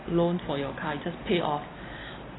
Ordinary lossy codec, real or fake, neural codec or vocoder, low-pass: AAC, 16 kbps; real; none; 7.2 kHz